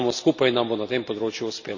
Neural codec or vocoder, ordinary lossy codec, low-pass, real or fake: none; none; 7.2 kHz; real